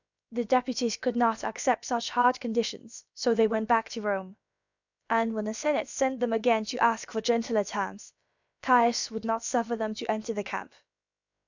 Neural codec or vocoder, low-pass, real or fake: codec, 16 kHz, about 1 kbps, DyCAST, with the encoder's durations; 7.2 kHz; fake